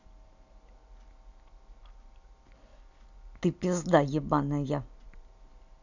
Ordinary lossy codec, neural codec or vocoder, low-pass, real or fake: none; none; 7.2 kHz; real